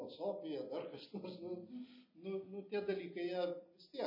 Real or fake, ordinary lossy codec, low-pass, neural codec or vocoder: real; MP3, 24 kbps; 5.4 kHz; none